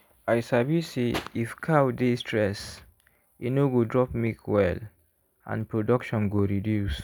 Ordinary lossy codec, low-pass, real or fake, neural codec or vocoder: none; none; real; none